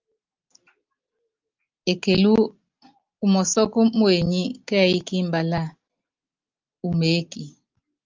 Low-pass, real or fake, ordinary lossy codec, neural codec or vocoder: 7.2 kHz; real; Opus, 24 kbps; none